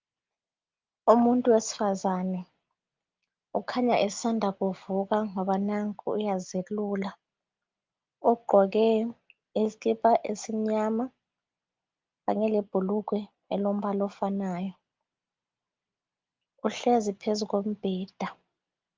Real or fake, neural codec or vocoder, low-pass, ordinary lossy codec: real; none; 7.2 kHz; Opus, 24 kbps